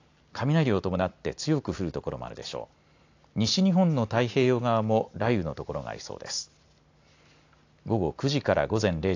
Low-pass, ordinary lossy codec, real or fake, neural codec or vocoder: 7.2 kHz; AAC, 48 kbps; real; none